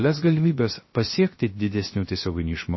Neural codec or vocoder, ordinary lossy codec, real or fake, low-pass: codec, 16 kHz, 0.3 kbps, FocalCodec; MP3, 24 kbps; fake; 7.2 kHz